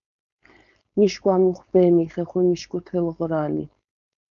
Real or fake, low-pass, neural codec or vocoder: fake; 7.2 kHz; codec, 16 kHz, 4.8 kbps, FACodec